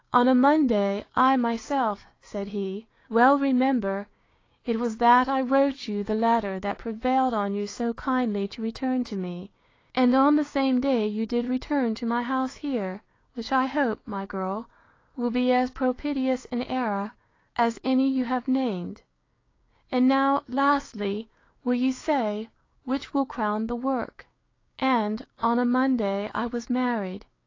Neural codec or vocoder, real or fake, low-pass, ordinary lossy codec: codec, 16 kHz, 6 kbps, DAC; fake; 7.2 kHz; AAC, 32 kbps